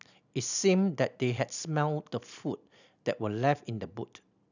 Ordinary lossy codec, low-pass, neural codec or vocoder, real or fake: none; 7.2 kHz; none; real